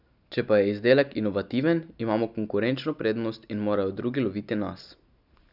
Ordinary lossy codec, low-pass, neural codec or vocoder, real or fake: none; 5.4 kHz; none; real